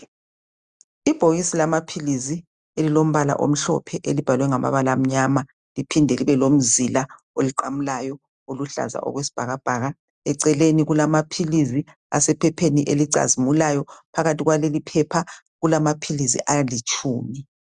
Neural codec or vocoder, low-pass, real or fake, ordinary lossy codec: none; 9.9 kHz; real; Opus, 64 kbps